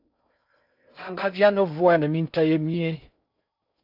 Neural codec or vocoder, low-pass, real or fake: codec, 16 kHz in and 24 kHz out, 0.6 kbps, FocalCodec, streaming, 4096 codes; 5.4 kHz; fake